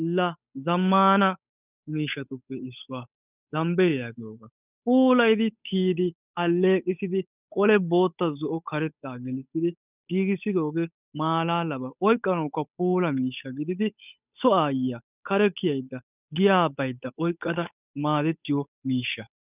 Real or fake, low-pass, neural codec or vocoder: fake; 3.6 kHz; codec, 16 kHz, 8 kbps, FunCodec, trained on Chinese and English, 25 frames a second